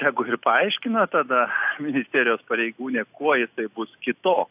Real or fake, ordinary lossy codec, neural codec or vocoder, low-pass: real; AAC, 32 kbps; none; 3.6 kHz